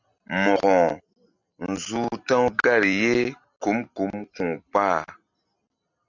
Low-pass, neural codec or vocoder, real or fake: 7.2 kHz; none; real